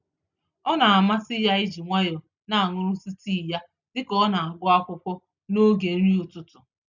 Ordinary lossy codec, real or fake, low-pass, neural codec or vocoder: none; real; 7.2 kHz; none